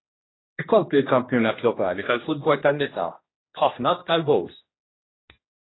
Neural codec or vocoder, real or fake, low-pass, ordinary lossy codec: codec, 16 kHz, 1 kbps, X-Codec, HuBERT features, trained on general audio; fake; 7.2 kHz; AAC, 16 kbps